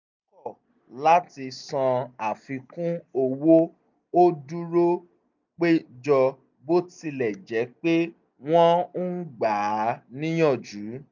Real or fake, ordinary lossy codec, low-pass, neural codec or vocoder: real; none; 7.2 kHz; none